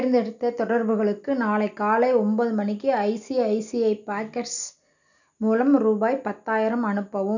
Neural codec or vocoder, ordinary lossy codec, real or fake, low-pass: none; none; real; 7.2 kHz